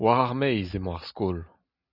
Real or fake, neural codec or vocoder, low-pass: real; none; 5.4 kHz